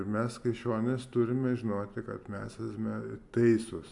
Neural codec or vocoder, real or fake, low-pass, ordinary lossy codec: none; real; 10.8 kHz; AAC, 64 kbps